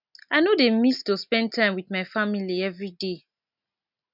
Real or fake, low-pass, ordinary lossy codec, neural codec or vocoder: real; 5.4 kHz; none; none